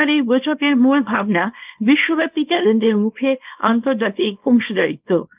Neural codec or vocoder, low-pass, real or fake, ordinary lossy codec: codec, 24 kHz, 0.9 kbps, WavTokenizer, small release; 3.6 kHz; fake; Opus, 24 kbps